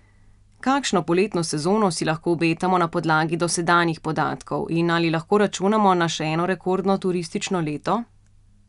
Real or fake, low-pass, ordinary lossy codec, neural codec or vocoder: real; 10.8 kHz; none; none